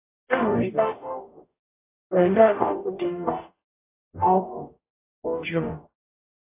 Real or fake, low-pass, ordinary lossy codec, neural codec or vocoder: fake; 3.6 kHz; AAC, 32 kbps; codec, 44.1 kHz, 0.9 kbps, DAC